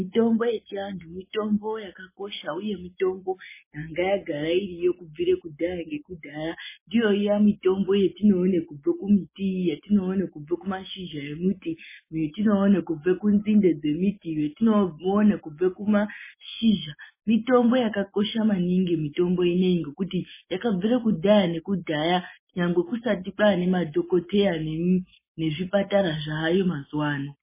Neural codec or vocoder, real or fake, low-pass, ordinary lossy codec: none; real; 3.6 kHz; MP3, 16 kbps